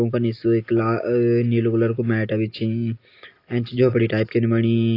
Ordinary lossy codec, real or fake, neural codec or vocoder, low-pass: AAC, 32 kbps; real; none; 5.4 kHz